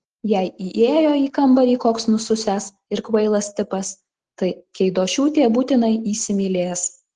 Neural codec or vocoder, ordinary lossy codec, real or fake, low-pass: none; Opus, 16 kbps; real; 10.8 kHz